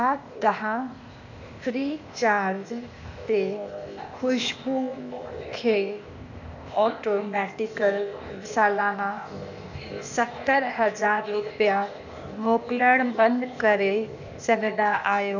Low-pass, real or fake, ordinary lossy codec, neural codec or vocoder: 7.2 kHz; fake; none; codec, 16 kHz, 0.8 kbps, ZipCodec